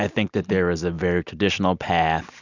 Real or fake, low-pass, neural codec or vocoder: real; 7.2 kHz; none